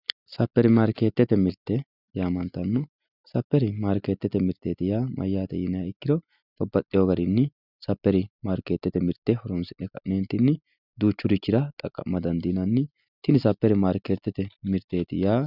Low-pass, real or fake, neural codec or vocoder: 5.4 kHz; real; none